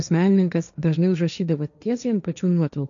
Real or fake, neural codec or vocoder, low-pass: fake; codec, 16 kHz, 1.1 kbps, Voila-Tokenizer; 7.2 kHz